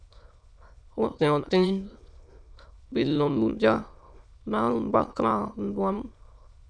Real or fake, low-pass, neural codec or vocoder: fake; 9.9 kHz; autoencoder, 22.05 kHz, a latent of 192 numbers a frame, VITS, trained on many speakers